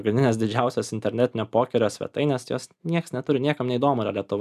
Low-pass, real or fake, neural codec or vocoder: 14.4 kHz; real; none